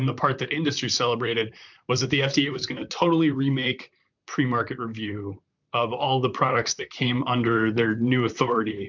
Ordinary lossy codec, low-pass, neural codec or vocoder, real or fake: MP3, 64 kbps; 7.2 kHz; vocoder, 44.1 kHz, 128 mel bands, Pupu-Vocoder; fake